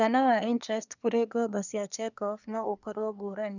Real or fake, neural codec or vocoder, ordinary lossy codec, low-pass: fake; codec, 24 kHz, 1 kbps, SNAC; none; 7.2 kHz